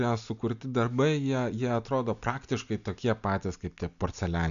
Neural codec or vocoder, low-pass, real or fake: none; 7.2 kHz; real